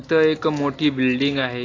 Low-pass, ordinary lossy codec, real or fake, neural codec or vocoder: 7.2 kHz; AAC, 32 kbps; real; none